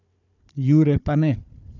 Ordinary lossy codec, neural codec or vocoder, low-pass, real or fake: none; codec, 16 kHz, 4 kbps, FunCodec, trained on Chinese and English, 50 frames a second; 7.2 kHz; fake